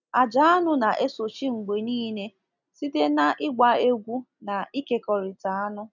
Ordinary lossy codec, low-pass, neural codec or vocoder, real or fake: none; 7.2 kHz; none; real